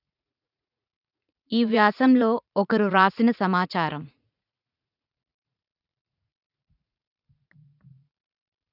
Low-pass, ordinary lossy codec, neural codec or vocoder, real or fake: 5.4 kHz; none; vocoder, 44.1 kHz, 80 mel bands, Vocos; fake